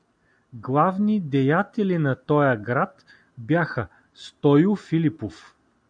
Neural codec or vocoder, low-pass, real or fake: none; 9.9 kHz; real